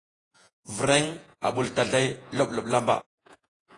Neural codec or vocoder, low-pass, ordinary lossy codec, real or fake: vocoder, 48 kHz, 128 mel bands, Vocos; 10.8 kHz; AAC, 32 kbps; fake